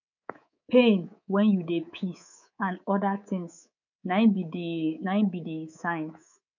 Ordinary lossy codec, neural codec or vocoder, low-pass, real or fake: none; codec, 24 kHz, 3.1 kbps, DualCodec; 7.2 kHz; fake